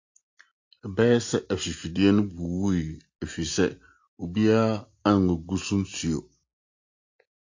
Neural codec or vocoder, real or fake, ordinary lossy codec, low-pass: none; real; AAC, 48 kbps; 7.2 kHz